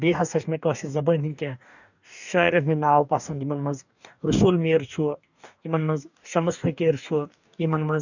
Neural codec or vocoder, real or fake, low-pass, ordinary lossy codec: codec, 44.1 kHz, 2.6 kbps, DAC; fake; 7.2 kHz; none